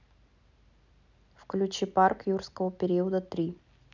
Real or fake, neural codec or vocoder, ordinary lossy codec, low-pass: real; none; none; 7.2 kHz